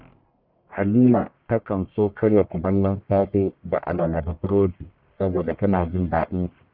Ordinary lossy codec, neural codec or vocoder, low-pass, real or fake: none; codec, 44.1 kHz, 1.7 kbps, Pupu-Codec; 5.4 kHz; fake